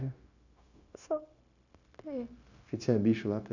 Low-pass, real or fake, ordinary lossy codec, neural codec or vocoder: 7.2 kHz; fake; none; codec, 16 kHz, 0.9 kbps, LongCat-Audio-Codec